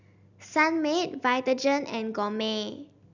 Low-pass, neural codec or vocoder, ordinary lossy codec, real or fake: 7.2 kHz; none; none; real